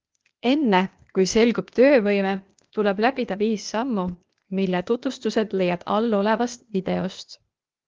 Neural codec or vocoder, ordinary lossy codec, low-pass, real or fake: codec, 16 kHz, 0.8 kbps, ZipCodec; Opus, 24 kbps; 7.2 kHz; fake